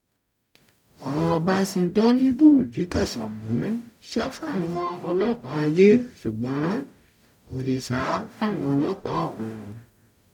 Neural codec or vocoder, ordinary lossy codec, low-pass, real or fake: codec, 44.1 kHz, 0.9 kbps, DAC; none; 19.8 kHz; fake